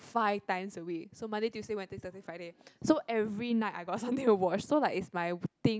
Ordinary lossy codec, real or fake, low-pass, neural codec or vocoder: none; real; none; none